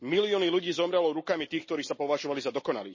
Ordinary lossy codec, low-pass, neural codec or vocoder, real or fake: MP3, 32 kbps; 7.2 kHz; none; real